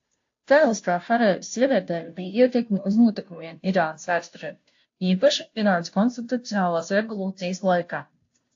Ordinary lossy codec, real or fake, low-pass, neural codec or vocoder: AAC, 48 kbps; fake; 7.2 kHz; codec, 16 kHz, 0.5 kbps, FunCodec, trained on Chinese and English, 25 frames a second